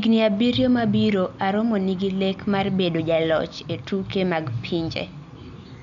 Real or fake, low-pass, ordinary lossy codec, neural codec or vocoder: real; 7.2 kHz; none; none